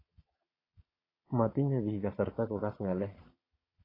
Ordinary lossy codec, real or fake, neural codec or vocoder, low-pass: AAC, 24 kbps; fake; vocoder, 22.05 kHz, 80 mel bands, Vocos; 5.4 kHz